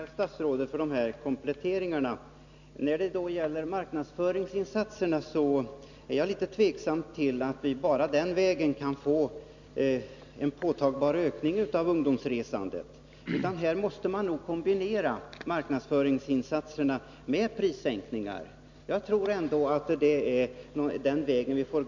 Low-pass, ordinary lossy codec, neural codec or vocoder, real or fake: 7.2 kHz; none; none; real